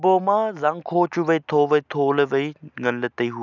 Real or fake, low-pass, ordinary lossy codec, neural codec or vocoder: real; 7.2 kHz; none; none